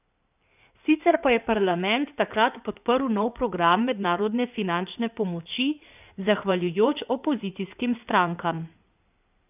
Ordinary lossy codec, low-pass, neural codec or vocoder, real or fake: none; 3.6 kHz; codec, 16 kHz in and 24 kHz out, 2.2 kbps, FireRedTTS-2 codec; fake